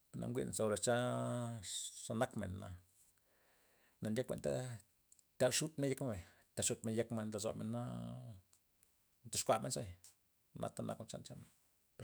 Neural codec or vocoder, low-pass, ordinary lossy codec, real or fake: autoencoder, 48 kHz, 128 numbers a frame, DAC-VAE, trained on Japanese speech; none; none; fake